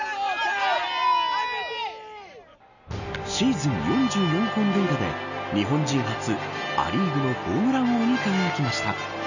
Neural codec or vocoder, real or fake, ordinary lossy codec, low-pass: none; real; none; 7.2 kHz